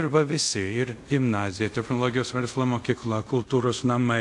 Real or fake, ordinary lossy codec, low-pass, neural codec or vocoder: fake; AAC, 64 kbps; 10.8 kHz; codec, 24 kHz, 0.5 kbps, DualCodec